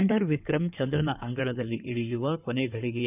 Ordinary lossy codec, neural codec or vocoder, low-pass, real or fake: none; codec, 16 kHz in and 24 kHz out, 2.2 kbps, FireRedTTS-2 codec; 3.6 kHz; fake